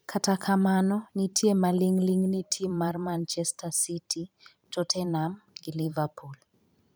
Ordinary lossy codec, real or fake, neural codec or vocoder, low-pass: none; fake; vocoder, 44.1 kHz, 128 mel bands every 512 samples, BigVGAN v2; none